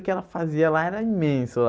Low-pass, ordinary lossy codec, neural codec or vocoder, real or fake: none; none; none; real